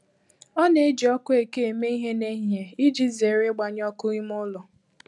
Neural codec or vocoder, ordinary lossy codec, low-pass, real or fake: none; none; 10.8 kHz; real